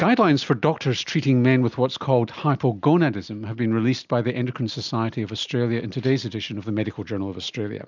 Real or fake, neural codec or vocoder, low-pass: real; none; 7.2 kHz